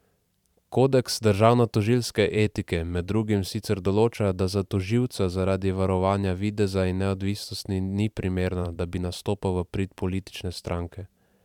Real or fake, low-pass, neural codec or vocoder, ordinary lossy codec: fake; 19.8 kHz; vocoder, 44.1 kHz, 128 mel bands every 512 samples, BigVGAN v2; none